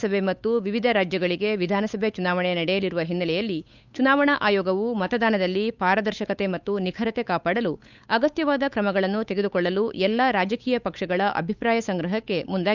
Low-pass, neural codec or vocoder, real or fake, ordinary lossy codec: 7.2 kHz; codec, 16 kHz, 8 kbps, FunCodec, trained on Chinese and English, 25 frames a second; fake; none